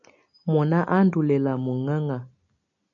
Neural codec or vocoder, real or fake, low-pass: none; real; 7.2 kHz